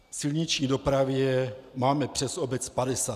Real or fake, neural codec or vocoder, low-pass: real; none; 14.4 kHz